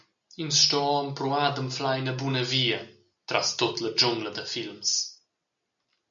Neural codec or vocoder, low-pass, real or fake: none; 7.2 kHz; real